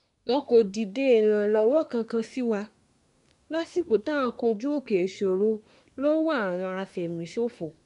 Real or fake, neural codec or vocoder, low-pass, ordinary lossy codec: fake; codec, 24 kHz, 1 kbps, SNAC; 10.8 kHz; none